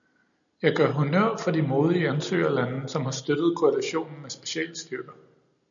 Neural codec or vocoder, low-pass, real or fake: none; 7.2 kHz; real